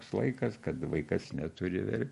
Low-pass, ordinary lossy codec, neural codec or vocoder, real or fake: 14.4 kHz; MP3, 48 kbps; none; real